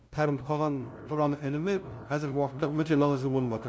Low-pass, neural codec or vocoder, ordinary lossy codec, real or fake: none; codec, 16 kHz, 0.5 kbps, FunCodec, trained on LibriTTS, 25 frames a second; none; fake